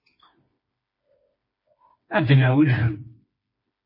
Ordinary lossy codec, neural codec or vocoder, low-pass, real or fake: MP3, 24 kbps; codec, 16 kHz, 2 kbps, FreqCodec, smaller model; 5.4 kHz; fake